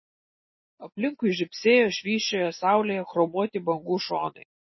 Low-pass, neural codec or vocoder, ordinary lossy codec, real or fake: 7.2 kHz; none; MP3, 24 kbps; real